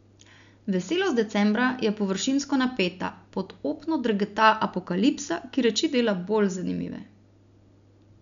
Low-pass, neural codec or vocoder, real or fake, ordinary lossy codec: 7.2 kHz; none; real; none